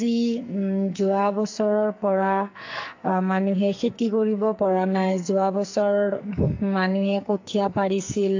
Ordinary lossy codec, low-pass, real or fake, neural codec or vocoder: AAC, 48 kbps; 7.2 kHz; fake; codec, 44.1 kHz, 2.6 kbps, SNAC